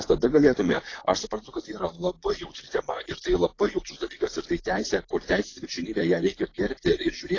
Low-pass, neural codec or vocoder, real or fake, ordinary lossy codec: 7.2 kHz; vocoder, 44.1 kHz, 128 mel bands, Pupu-Vocoder; fake; AAC, 32 kbps